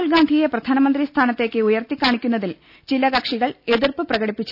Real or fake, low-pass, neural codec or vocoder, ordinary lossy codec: real; 5.4 kHz; none; none